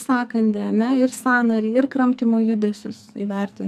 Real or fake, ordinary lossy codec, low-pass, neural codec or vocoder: fake; AAC, 96 kbps; 14.4 kHz; codec, 44.1 kHz, 2.6 kbps, SNAC